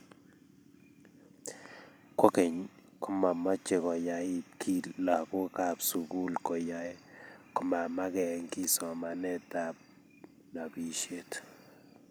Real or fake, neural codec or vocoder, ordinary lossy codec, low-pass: fake; vocoder, 44.1 kHz, 128 mel bands every 256 samples, BigVGAN v2; none; none